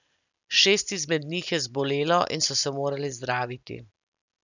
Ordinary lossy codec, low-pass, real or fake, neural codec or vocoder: none; 7.2 kHz; real; none